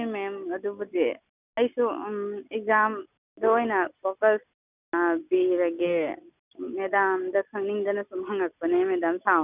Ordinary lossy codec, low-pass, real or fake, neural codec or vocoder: none; 3.6 kHz; real; none